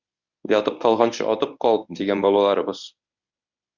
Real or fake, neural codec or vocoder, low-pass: fake; codec, 24 kHz, 0.9 kbps, WavTokenizer, medium speech release version 1; 7.2 kHz